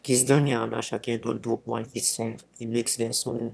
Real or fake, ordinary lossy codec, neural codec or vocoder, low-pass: fake; none; autoencoder, 22.05 kHz, a latent of 192 numbers a frame, VITS, trained on one speaker; none